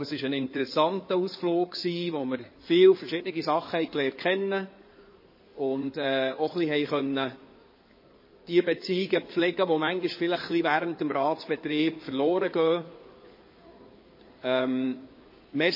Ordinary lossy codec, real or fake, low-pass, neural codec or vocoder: MP3, 24 kbps; fake; 5.4 kHz; codec, 16 kHz in and 24 kHz out, 2.2 kbps, FireRedTTS-2 codec